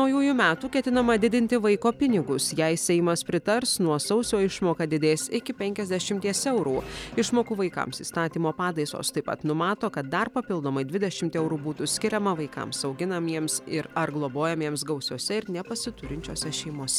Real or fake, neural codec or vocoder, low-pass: real; none; 19.8 kHz